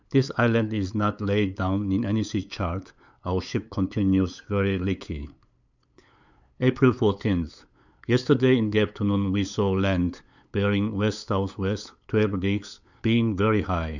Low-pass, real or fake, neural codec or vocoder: 7.2 kHz; fake; codec, 16 kHz, 8 kbps, FunCodec, trained on LibriTTS, 25 frames a second